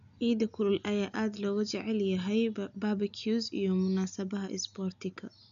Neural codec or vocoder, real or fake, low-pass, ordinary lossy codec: none; real; 7.2 kHz; none